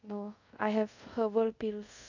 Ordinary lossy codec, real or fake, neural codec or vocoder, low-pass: none; fake; codec, 24 kHz, 0.5 kbps, DualCodec; 7.2 kHz